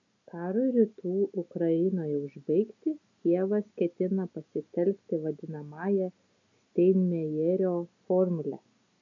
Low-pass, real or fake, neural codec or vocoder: 7.2 kHz; real; none